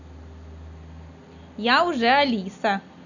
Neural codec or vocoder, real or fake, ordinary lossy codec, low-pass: none; real; none; 7.2 kHz